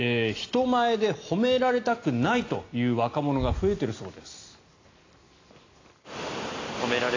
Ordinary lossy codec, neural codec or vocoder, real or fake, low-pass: AAC, 32 kbps; none; real; 7.2 kHz